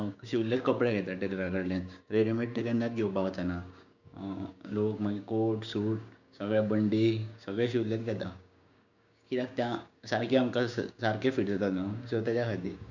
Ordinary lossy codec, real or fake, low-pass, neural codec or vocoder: none; fake; 7.2 kHz; codec, 16 kHz, 6 kbps, DAC